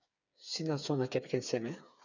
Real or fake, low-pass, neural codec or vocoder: fake; 7.2 kHz; codec, 16 kHz, 8 kbps, FreqCodec, smaller model